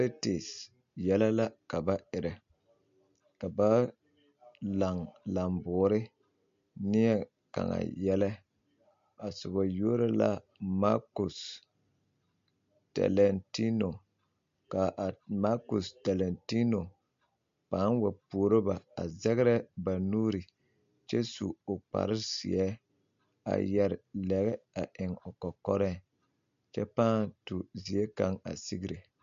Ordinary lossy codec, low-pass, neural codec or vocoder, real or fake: MP3, 48 kbps; 7.2 kHz; none; real